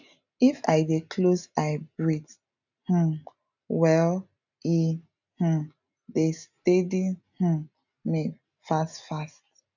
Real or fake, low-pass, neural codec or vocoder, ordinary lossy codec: real; 7.2 kHz; none; none